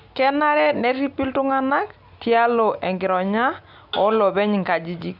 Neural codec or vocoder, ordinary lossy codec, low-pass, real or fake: none; none; 5.4 kHz; real